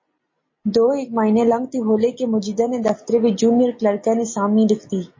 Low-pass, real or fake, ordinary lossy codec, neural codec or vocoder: 7.2 kHz; real; MP3, 32 kbps; none